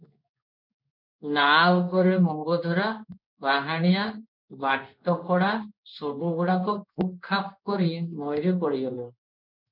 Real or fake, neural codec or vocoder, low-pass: fake; codec, 16 kHz in and 24 kHz out, 1 kbps, XY-Tokenizer; 5.4 kHz